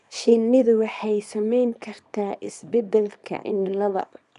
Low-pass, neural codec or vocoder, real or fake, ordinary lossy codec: 10.8 kHz; codec, 24 kHz, 0.9 kbps, WavTokenizer, small release; fake; none